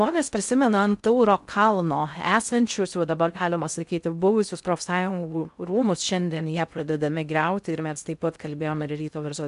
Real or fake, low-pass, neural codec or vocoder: fake; 10.8 kHz; codec, 16 kHz in and 24 kHz out, 0.6 kbps, FocalCodec, streaming, 2048 codes